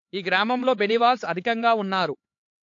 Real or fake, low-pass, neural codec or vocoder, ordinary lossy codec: fake; 7.2 kHz; codec, 16 kHz, 4 kbps, X-Codec, HuBERT features, trained on LibriSpeech; AAC, 48 kbps